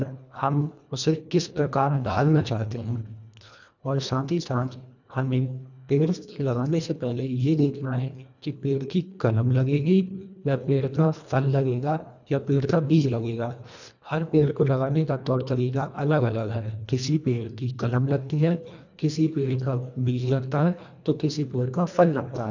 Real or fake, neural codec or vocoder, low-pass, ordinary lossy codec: fake; codec, 24 kHz, 1.5 kbps, HILCodec; 7.2 kHz; none